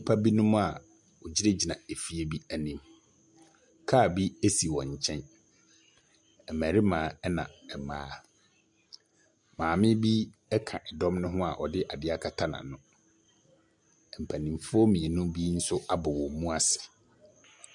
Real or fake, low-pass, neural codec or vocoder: real; 10.8 kHz; none